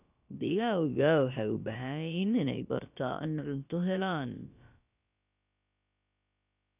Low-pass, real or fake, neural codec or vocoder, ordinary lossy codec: 3.6 kHz; fake; codec, 16 kHz, about 1 kbps, DyCAST, with the encoder's durations; none